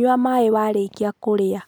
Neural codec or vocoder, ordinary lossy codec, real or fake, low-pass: none; none; real; none